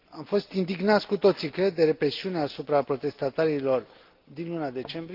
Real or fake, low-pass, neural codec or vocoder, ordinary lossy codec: real; 5.4 kHz; none; Opus, 32 kbps